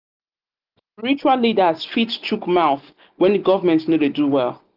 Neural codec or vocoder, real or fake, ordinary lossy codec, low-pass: none; real; Opus, 32 kbps; 5.4 kHz